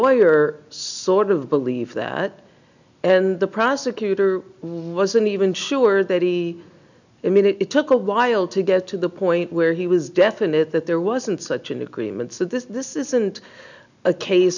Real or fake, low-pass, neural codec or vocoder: real; 7.2 kHz; none